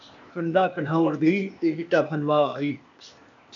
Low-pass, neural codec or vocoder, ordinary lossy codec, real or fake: 7.2 kHz; codec, 16 kHz, 0.8 kbps, ZipCodec; AAC, 64 kbps; fake